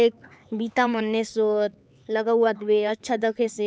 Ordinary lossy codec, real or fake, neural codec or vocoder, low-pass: none; fake; codec, 16 kHz, 4 kbps, X-Codec, HuBERT features, trained on LibriSpeech; none